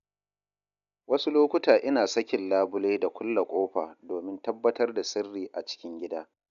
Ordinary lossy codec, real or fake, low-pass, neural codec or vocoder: none; real; 7.2 kHz; none